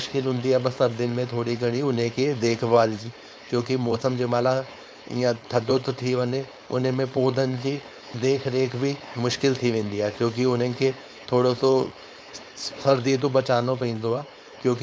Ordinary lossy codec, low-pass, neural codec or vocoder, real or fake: none; none; codec, 16 kHz, 4.8 kbps, FACodec; fake